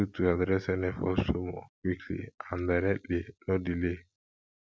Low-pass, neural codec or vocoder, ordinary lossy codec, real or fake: none; none; none; real